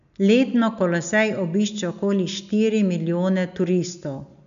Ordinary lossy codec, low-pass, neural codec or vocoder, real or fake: none; 7.2 kHz; none; real